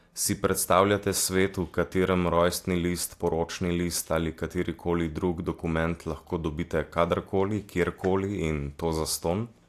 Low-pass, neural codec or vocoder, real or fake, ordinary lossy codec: 14.4 kHz; none; real; AAC, 64 kbps